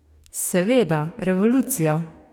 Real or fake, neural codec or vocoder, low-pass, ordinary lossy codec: fake; codec, 44.1 kHz, 2.6 kbps, DAC; 19.8 kHz; none